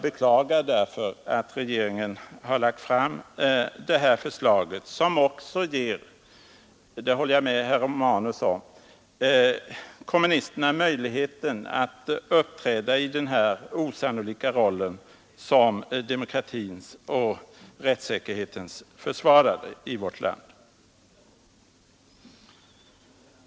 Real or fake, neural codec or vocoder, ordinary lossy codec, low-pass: real; none; none; none